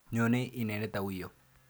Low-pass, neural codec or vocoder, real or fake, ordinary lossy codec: none; none; real; none